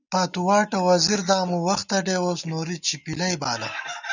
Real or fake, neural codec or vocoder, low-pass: real; none; 7.2 kHz